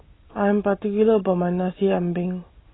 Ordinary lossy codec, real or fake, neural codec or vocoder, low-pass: AAC, 16 kbps; real; none; 7.2 kHz